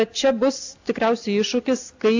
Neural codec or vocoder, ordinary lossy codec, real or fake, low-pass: none; AAC, 48 kbps; real; 7.2 kHz